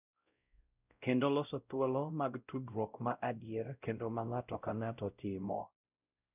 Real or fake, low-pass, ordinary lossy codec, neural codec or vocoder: fake; 3.6 kHz; none; codec, 16 kHz, 0.5 kbps, X-Codec, WavLM features, trained on Multilingual LibriSpeech